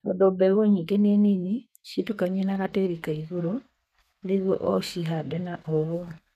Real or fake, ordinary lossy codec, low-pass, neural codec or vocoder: fake; none; 14.4 kHz; codec, 32 kHz, 1.9 kbps, SNAC